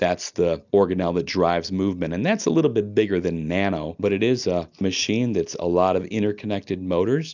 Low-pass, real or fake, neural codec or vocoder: 7.2 kHz; real; none